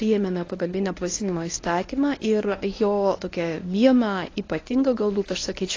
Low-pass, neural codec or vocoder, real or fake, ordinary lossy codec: 7.2 kHz; codec, 24 kHz, 0.9 kbps, WavTokenizer, medium speech release version 1; fake; AAC, 32 kbps